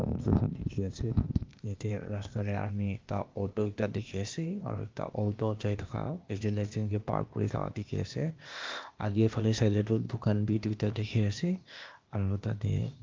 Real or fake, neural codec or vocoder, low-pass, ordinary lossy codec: fake; codec, 16 kHz, 0.8 kbps, ZipCodec; 7.2 kHz; Opus, 24 kbps